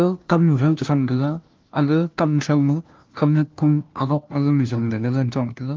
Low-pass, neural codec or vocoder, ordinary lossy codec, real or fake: 7.2 kHz; codec, 16 kHz, 1 kbps, FunCodec, trained on LibriTTS, 50 frames a second; Opus, 32 kbps; fake